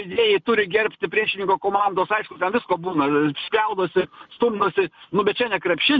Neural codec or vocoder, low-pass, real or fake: none; 7.2 kHz; real